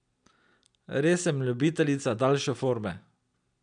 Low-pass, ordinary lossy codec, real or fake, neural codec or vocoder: 9.9 kHz; none; real; none